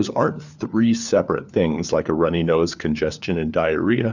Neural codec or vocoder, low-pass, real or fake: codec, 16 kHz, 4 kbps, FunCodec, trained on LibriTTS, 50 frames a second; 7.2 kHz; fake